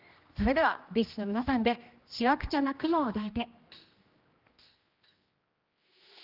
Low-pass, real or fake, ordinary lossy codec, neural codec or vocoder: 5.4 kHz; fake; Opus, 24 kbps; codec, 16 kHz, 1 kbps, X-Codec, HuBERT features, trained on general audio